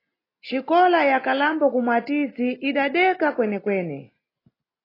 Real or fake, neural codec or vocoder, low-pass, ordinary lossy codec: real; none; 5.4 kHz; AAC, 24 kbps